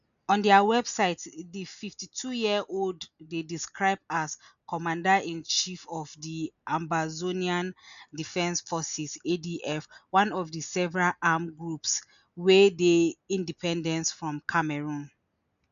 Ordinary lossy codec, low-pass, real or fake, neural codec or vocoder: none; 7.2 kHz; real; none